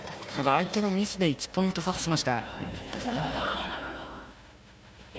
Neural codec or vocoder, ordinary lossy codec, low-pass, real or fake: codec, 16 kHz, 1 kbps, FunCodec, trained on Chinese and English, 50 frames a second; none; none; fake